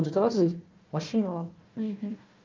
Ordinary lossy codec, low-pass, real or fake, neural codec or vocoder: Opus, 24 kbps; 7.2 kHz; fake; codec, 16 kHz, 1 kbps, FunCodec, trained on Chinese and English, 50 frames a second